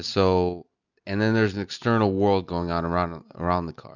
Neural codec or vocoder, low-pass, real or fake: none; 7.2 kHz; real